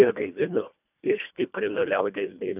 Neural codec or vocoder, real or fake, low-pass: codec, 24 kHz, 1.5 kbps, HILCodec; fake; 3.6 kHz